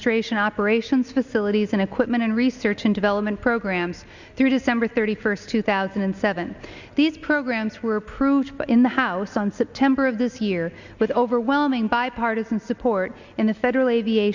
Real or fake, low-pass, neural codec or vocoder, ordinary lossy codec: real; 7.2 kHz; none; Opus, 64 kbps